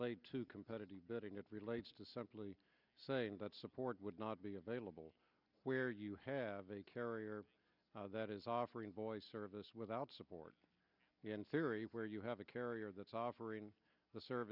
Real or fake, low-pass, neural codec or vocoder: real; 5.4 kHz; none